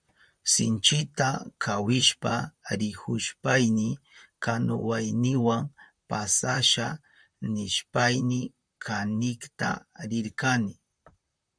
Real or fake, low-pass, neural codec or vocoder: fake; 9.9 kHz; vocoder, 44.1 kHz, 128 mel bands, Pupu-Vocoder